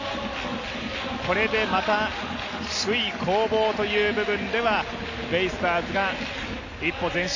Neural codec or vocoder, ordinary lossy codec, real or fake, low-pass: none; AAC, 48 kbps; real; 7.2 kHz